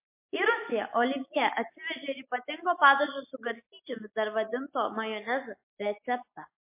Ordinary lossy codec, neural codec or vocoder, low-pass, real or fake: MP3, 24 kbps; none; 3.6 kHz; real